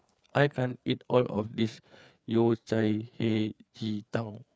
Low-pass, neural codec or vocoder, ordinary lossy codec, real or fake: none; codec, 16 kHz, 4 kbps, FreqCodec, larger model; none; fake